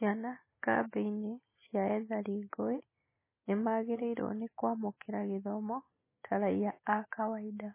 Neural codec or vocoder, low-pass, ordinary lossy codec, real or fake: none; 3.6 kHz; MP3, 16 kbps; real